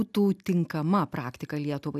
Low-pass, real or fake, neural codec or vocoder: 14.4 kHz; real; none